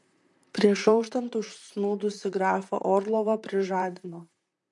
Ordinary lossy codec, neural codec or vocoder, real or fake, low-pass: MP3, 64 kbps; vocoder, 44.1 kHz, 128 mel bands, Pupu-Vocoder; fake; 10.8 kHz